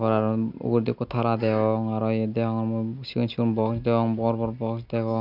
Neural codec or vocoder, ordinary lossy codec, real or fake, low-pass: none; none; real; 5.4 kHz